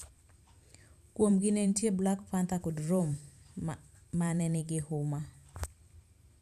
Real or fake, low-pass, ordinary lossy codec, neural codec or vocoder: fake; 14.4 kHz; none; vocoder, 44.1 kHz, 128 mel bands every 512 samples, BigVGAN v2